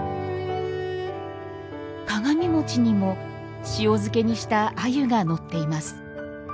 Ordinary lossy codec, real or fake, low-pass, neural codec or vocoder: none; real; none; none